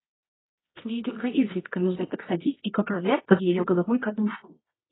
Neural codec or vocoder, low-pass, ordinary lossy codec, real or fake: codec, 24 kHz, 0.9 kbps, WavTokenizer, medium music audio release; 7.2 kHz; AAC, 16 kbps; fake